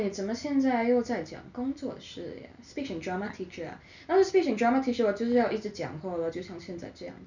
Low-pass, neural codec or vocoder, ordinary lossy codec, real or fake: 7.2 kHz; none; none; real